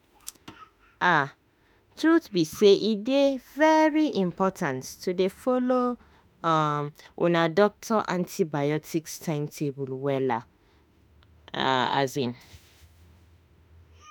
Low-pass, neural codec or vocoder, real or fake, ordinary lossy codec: none; autoencoder, 48 kHz, 32 numbers a frame, DAC-VAE, trained on Japanese speech; fake; none